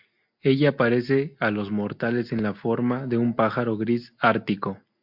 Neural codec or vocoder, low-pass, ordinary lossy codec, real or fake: none; 5.4 kHz; MP3, 48 kbps; real